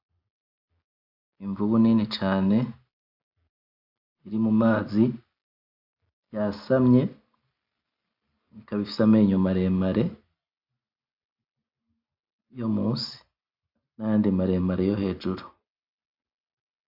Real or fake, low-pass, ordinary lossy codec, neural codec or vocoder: real; 5.4 kHz; AAC, 48 kbps; none